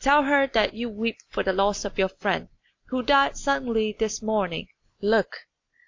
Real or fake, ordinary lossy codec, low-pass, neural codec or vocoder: real; AAC, 48 kbps; 7.2 kHz; none